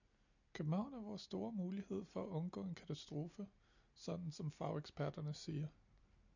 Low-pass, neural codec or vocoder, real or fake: 7.2 kHz; none; real